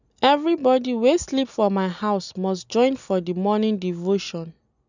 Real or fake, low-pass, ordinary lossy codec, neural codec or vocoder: real; 7.2 kHz; none; none